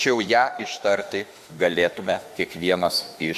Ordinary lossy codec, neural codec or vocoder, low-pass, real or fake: AAC, 96 kbps; autoencoder, 48 kHz, 32 numbers a frame, DAC-VAE, trained on Japanese speech; 14.4 kHz; fake